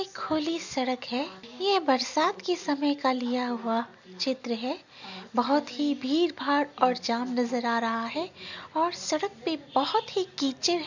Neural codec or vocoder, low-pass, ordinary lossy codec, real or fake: none; 7.2 kHz; none; real